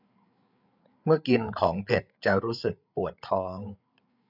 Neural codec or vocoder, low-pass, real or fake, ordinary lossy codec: codec, 16 kHz, 8 kbps, FreqCodec, larger model; 5.4 kHz; fake; none